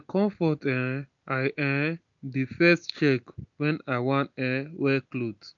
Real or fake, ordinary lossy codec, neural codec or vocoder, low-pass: real; none; none; 7.2 kHz